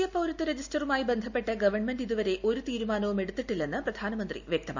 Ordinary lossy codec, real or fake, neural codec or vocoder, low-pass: none; real; none; 7.2 kHz